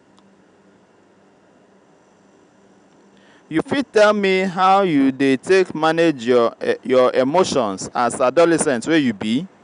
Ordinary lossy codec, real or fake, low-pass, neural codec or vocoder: none; real; 9.9 kHz; none